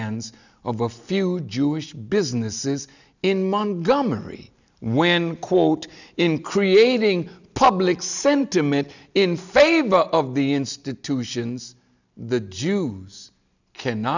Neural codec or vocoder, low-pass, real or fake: none; 7.2 kHz; real